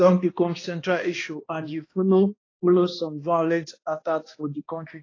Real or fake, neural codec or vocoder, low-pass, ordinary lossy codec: fake; codec, 16 kHz, 1 kbps, X-Codec, HuBERT features, trained on balanced general audio; 7.2 kHz; AAC, 32 kbps